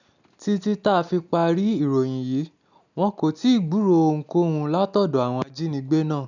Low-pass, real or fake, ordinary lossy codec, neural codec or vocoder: 7.2 kHz; real; none; none